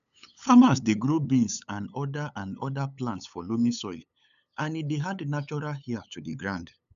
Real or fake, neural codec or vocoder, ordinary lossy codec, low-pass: fake; codec, 16 kHz, 8 kbps, FunCodec, trained on LibriTTS, 25 frames a second; none; 7.2 kHz